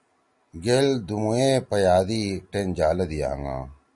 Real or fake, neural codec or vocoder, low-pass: real; none; 10.8 kHz